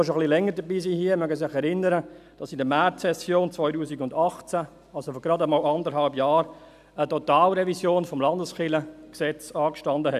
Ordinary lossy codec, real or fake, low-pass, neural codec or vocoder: none; real; 14.4 kHz; none